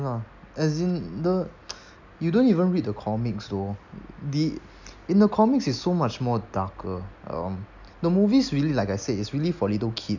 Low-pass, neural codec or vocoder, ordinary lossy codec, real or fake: 7.2 kHz; none; none; real